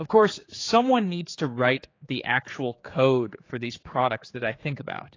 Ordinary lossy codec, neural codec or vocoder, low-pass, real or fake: AAC, 32 kbps; codec, 16 kHz, 4 kbps, X-Codec, HuBERT features, trained on general audio; 7.2 kHz; fake